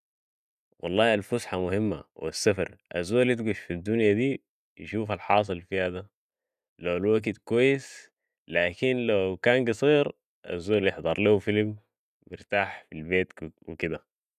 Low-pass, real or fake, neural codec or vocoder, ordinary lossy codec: 14.4 kHz; real; none; none